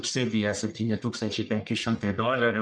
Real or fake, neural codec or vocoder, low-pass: fake; codec, 44.1 kHz, 1.7 kbps, Pupu-Codec; 9.9 kHz